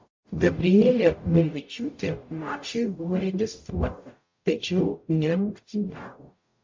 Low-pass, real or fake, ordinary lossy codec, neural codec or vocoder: 7.2 kHz; fake; MP3, 48 kbps; codec, 44.1 kHz, 0.9 kbps, DAC